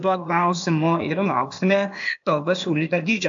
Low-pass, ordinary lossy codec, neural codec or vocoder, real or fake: 7.2 kHz; AAC, 64 kbps; codec, 16 kHz, 0.8 kbps, ZipCodec; fake